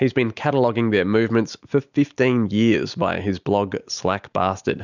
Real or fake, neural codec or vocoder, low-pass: real; none; 7.2 kHz